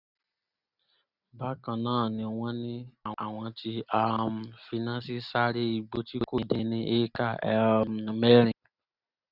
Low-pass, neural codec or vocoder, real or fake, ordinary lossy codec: 5.4 kHz; none; real; none